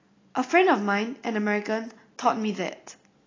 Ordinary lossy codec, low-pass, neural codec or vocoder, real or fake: AAC, 32 kbps; 7.2 kHz; none; real